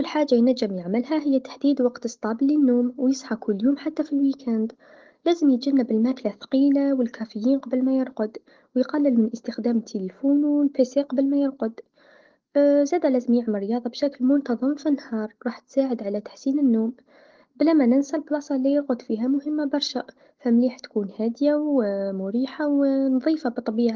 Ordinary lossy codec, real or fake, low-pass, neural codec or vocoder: Opus, 16 kbps; real; 7.2 kHz; none